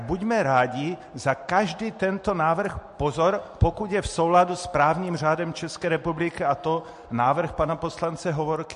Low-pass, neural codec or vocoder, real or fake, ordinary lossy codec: 14.4 kHz; none; real; MP3, 48 kbps